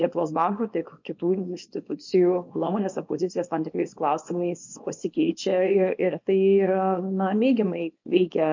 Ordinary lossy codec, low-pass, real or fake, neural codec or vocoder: MP3, 48 kbps; 7.2 kHz; fake; codec, 24 kHz, 0.9 kbps, WavTokenizer, small release